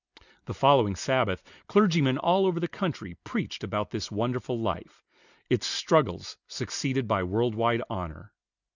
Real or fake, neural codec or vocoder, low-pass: real; none; 7.2 kHz